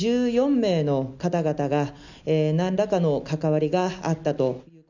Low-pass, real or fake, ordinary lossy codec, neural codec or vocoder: 7.2 kHz; real; none; none